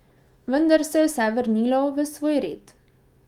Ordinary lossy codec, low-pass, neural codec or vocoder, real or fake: Opus, 32 kbps; 19.8 kHz; autoencoder, 48 kHz, 128 numbers a frame, DAC-VAE, trained on Japanese speech; fake